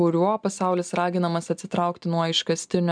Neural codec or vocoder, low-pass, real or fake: none; 9.9 kHz; real